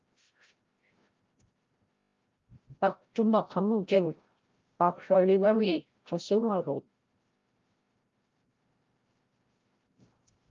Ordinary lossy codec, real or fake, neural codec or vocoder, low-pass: Opus, 32 kbps; fake; codec, 16 kHz, 0.5 kbps, FreqCodec, larger model; 7.2 kHz